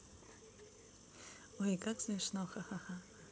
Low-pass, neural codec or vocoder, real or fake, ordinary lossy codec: none; none; real; none